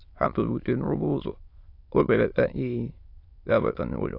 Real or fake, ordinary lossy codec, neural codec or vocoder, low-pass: fake; AAC, 32 kbps; autoencoder, 22.05 kHz, a latent of 192 numbers a frame, VITS, trained on many speakers; 5.4 kHz